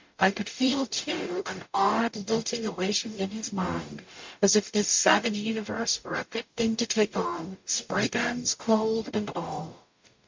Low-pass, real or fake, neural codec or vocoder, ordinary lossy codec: 7.2 kHz; fake; codec, 44.1 kHz, 0.9 kbps, DAC; MP3, 48 kbps